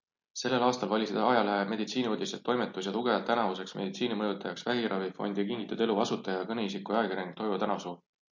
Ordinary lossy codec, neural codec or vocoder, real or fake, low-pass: MP3, 48 kbps; none; real; 7.2 kHz